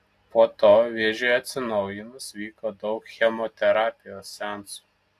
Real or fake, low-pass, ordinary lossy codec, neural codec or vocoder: real; 14.4 kHz; AAC, 64 kbps; none